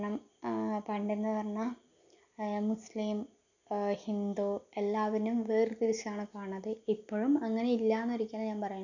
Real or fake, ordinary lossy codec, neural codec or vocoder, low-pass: real; none; none; 7.2 kHz